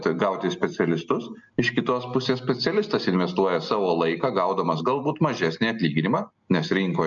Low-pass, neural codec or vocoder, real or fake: 7.2 kHz; none; real